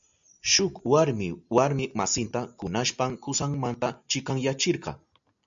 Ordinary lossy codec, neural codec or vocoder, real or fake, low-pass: MP3, 64 kbps; none; real; 7.2 kHz